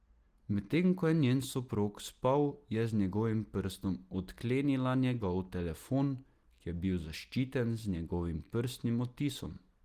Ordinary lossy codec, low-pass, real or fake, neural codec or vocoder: Opus, 24 kbps; 14.4 kHz; real; none